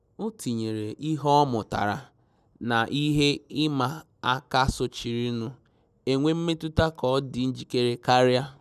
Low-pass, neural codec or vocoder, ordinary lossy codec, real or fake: 14.4 kHz; none; none; real